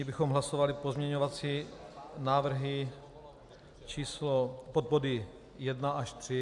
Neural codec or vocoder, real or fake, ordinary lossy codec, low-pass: none; real; MP3, 96 kbps; 10.8 kHz